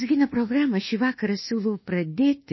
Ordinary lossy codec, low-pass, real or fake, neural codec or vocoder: MP3, 24 kbps; 7.2 kHz; fake; codec, 24 kHz, 1.2 kbps, DualCodec